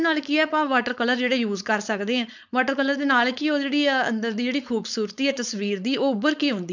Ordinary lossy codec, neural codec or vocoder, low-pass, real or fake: none; codec, 16 kHz, 4.8 kbps, FACodec; 7.2 kHz; fake